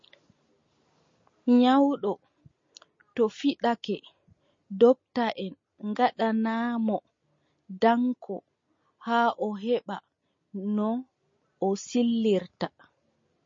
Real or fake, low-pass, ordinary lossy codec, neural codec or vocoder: real; 7.2 kHz; MP3, 32 kbps; none